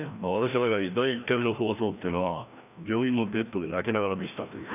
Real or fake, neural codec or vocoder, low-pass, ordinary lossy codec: fake; codec, 16 kHz, 1 kbps, FreqCodec, larger model; 3.6 kHz; none